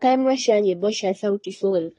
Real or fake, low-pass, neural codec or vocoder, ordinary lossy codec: fake; 10.8 kHz; codec, 24 kHz, 1 kbps, SNAC; AAC, 32 kbps